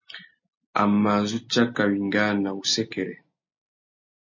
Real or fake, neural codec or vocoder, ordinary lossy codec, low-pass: real; none; MP3, 32 kbps; 7.2 kHz